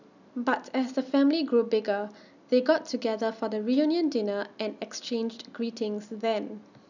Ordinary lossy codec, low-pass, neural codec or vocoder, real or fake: none; 7.2 kHz; none; real